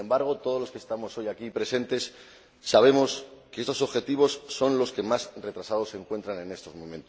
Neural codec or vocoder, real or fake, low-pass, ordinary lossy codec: none; real; none; none